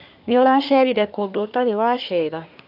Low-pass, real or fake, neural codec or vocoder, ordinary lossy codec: 5.4 kHz; fake; codec, 24 kHz, 1 kbps, SNAC; none